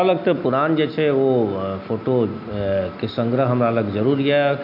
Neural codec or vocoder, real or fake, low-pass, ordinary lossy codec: none; real; 5.4 kHz; none